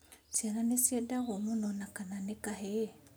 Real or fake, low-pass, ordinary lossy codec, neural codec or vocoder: real; none; none; none